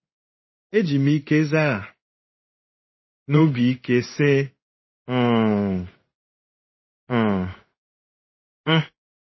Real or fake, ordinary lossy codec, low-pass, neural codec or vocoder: fake; MP3, 24 kbps; 7.2 kHz; codec, 16 kHz in and 24 kHz out, 1 kbps, XY-Tokenizer